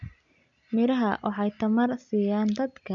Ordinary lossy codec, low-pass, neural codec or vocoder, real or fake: none; 7.2 kHz; none; real